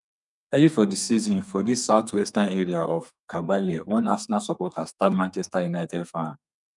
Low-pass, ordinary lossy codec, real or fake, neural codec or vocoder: 10.8 kHz; none; fake; codec, 32 kHz, 1.9 kbps, SNAC